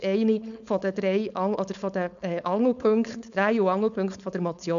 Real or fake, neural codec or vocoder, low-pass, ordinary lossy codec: fake; codec, 16 kHz, 4.8 kbps, FACodec; 7.2 kHz; none